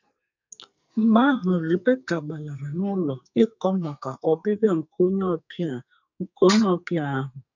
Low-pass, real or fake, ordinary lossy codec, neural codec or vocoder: 7.2 kHz; fake; none; codec, 44.1 kHz, 2.6 kbps, SNAC